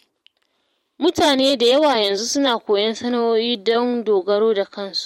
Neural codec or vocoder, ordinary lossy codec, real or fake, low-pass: vocoder, 44.1 kHz, 128 mel bands every 512 samples, BigVGAN v2; MP3, 64 kbps; fake; 19.8 kHz